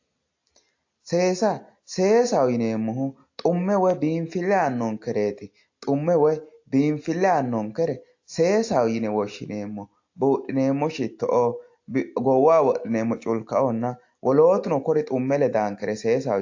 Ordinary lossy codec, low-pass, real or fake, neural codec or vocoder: AAC, 48 kbps; 7.2 kHz; real; none